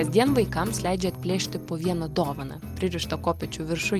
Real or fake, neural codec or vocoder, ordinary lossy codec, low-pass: real; none; Opus, 32 kbps; 14.4 kHz